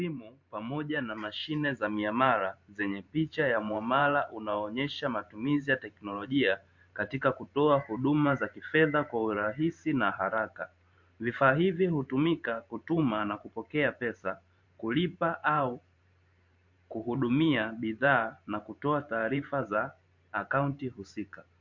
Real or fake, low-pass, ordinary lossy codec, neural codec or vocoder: fake; 7.2 kHz; MP3, 48 kbps; vocoder, 44.1 kHz, 128 mel bands every 512 samples, BigVGAN v2